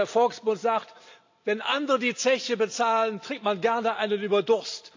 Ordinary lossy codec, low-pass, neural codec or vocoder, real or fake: AAC, 48 kbps; 7.2 kHz; none; real